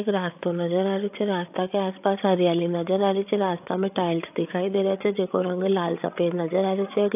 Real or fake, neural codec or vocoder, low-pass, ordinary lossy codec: fake; codec, 16 kHz, 8 kbps, FreqCodec, larger model; 3.6 kHz; AAC, 32 kbps